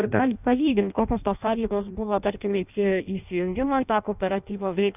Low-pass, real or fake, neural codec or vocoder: 3.6 kHz; fake; codec, 16 kHz in and 24 kHz out, 0.6 kbps, FireRedTTS-2 codec